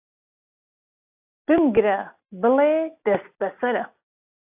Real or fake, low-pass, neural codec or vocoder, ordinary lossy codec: real; 3.6 kHz; none; AAC, 32 kbps